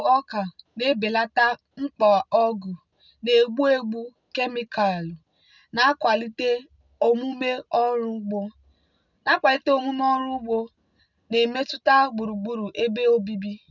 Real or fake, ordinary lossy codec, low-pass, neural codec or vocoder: real; none; 7.2 kHz; none